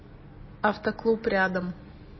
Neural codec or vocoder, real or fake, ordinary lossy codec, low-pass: none; real; MP3, 24 kbps; 7.2 kHz